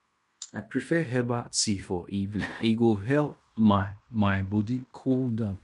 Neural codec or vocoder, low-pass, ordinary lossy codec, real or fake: codec, 16 kHz in and 24 kHz out, 0.9 kbps, LongCat-Audio-Codec, fine tuned four codebook decoder; 10.8 kHz; none; fake